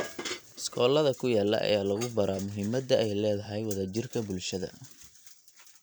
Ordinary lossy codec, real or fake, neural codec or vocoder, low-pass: none; fake; vocoder, 44.1 kHz, 128 mel bands every 256 samples, BigVGAN v2; none